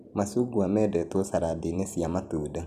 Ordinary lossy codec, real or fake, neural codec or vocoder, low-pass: none; fake; vocoder, 44.1 kHz, 128 mel bands every 256 samples, BigVGAN v2; 14.4 kHz